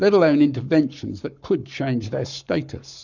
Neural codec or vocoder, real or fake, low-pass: codec, 44.1 kHz, 7.8 kbps, Pupu-Codec; fake; 7.2 kHz